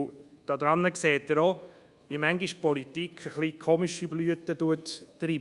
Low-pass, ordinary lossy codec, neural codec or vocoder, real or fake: 10.8 kHz; Opus, 64 kbps; codec, 24 kHz, 1.2 kbps, DualCodec; fake